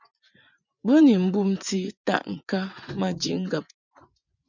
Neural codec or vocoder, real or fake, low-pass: vocoder, 24 kHz, 100 mel bands, Vocos; fake; 7.2 kHz